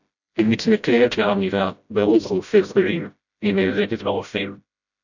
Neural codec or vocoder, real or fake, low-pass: codec, 16 kHz, 0.5 kbps, FreqCodec, smaller model; fake; 7.2 kHz